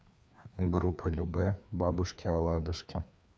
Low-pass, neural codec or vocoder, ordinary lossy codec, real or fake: none; codec, 16 kHz, 2 kbps, FreqCodec, larger model; none; fake